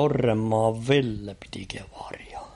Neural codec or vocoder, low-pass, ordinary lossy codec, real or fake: none; 19.8 kHz; MP3, 48 kbps; real